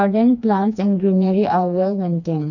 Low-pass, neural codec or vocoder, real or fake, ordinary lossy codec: 7.2 kHz; codec, 16 kHz, 2 kbps, FreqCodec, smaller model; fake; none